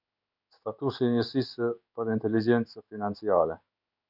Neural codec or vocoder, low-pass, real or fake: codec, 16 kHz in and 24 kHz out, 1 kbps, XY-Tokenizer; 5.4 kHz; fake